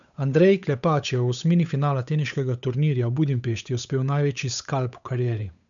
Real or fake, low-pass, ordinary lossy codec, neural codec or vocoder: fake; 7.2 kHz; none; codec, 16 kHz, 8 kbps, FunCodec, trained on Chinese and English, 25 frames a second